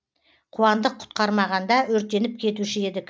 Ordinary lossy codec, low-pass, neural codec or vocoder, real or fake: none; none; none; real